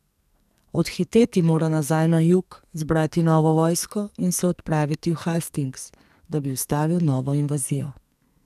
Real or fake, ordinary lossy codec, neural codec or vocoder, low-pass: fake; none; codec, 44.1 kHz, 2.6 kbps, SNAC; 14.4 kHz